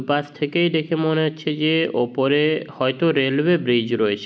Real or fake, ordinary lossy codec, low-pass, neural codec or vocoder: real; none; none; none